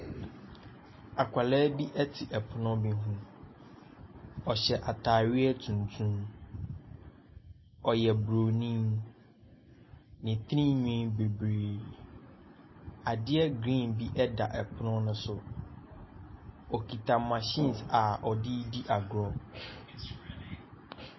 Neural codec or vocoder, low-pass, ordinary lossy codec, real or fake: none; 7.2 kHz; MP3, 24 kbps; real